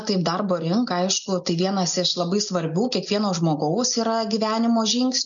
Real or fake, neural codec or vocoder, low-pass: real; none; 7.2 kHz